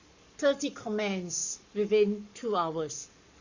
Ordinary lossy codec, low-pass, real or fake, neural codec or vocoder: Opus, 64 kbps; 7.2 kHz; fake; codec, 44.1 kHz, 7.8 kbps, Pupu-Codec